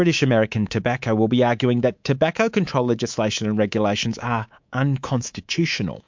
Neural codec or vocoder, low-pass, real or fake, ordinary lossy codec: codec, 24 kHz, 3.1 kbps, DualCodec; 7.2 kHz; fake; MP3, 64 kbps